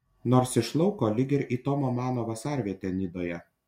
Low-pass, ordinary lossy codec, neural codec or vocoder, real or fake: 19.8 kHz; MP3, 64 kbps; none; real